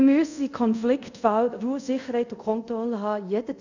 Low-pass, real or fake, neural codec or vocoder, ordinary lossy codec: 7.2 kHz; fake; codec, 24 kHz, 0.5 kbps, DualCodec; none